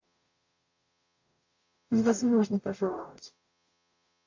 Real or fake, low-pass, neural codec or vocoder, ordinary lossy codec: fake; 7.2 kHz; codec, 44.1 kHz, 0.9 kbps, DAC; AAC, 48 kbps